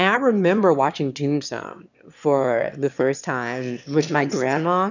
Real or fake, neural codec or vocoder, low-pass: fake; autoencoder, 22.05 kHz, a latent of 192 numbers a frame, VITS, trained on one speaker; 7.2 kHz